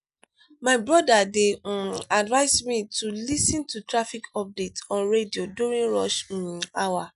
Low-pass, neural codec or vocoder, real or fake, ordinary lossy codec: 10.8 kHz; none; real; none